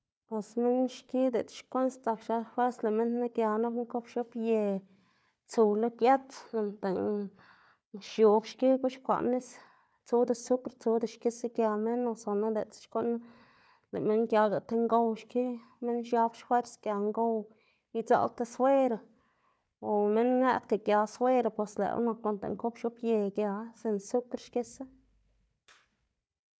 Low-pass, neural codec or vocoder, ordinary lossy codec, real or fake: none; codec, 16 kHz, 4 kbps, FunCodec, trained on Chinese and English, 50 frames a second; none; fake